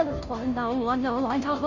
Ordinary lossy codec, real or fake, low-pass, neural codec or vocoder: none; fake; 7.2 kHz; codec, 16 kHz, 0.5 kbps, FunCodec, trained on Chinese and English, 25 frames a second